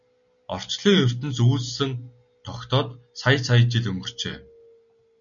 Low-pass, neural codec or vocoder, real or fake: 7.2 kHz; none; real